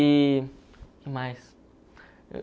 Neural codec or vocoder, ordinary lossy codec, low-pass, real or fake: none; none; none; real